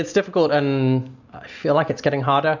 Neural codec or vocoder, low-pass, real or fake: none; 7.2 kHz; real